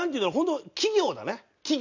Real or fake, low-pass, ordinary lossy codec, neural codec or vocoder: real; 7.2 kHz; none; none